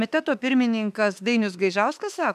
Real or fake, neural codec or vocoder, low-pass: fake; autoencoder, 48 kHz, 32 numbers a frame, DAC-VAE, trained on Japanese speech; 14.4 kHz